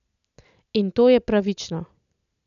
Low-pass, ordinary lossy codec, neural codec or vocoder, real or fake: 7.2 kHz; none; none; real